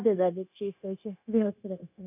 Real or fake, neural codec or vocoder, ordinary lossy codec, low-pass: fake; codec, 16 kHz, 0.9 kbps, LongCat-Audio-Codec; none; 3.6 kHz